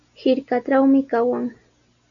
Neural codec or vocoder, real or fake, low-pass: none; real; 7.2 kHz